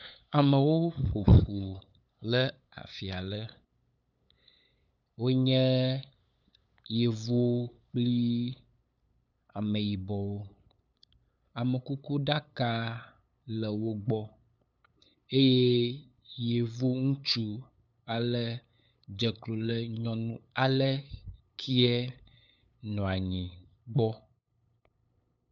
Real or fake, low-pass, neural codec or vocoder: fake; 7.2 kHz; codec, 16 kHz, 8 kbps, FunCodec, trained on LibriTTS, 25 frames a second